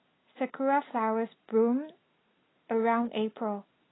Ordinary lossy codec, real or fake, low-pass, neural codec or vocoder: AAC, 16 kbps; real; 7.2 kHz; none